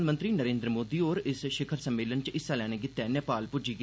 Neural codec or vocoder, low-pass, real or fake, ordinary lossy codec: none; none; real; none